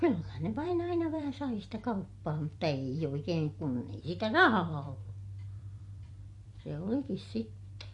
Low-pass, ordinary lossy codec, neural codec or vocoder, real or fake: 10.8 kHz; MP3, 48 kbps; vocoder, 44.1 kHz, 128 mel bands every 256 samples, BigVGAN v2; fake